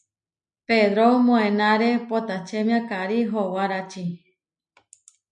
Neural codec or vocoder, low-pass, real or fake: none; 9.9 kHz; real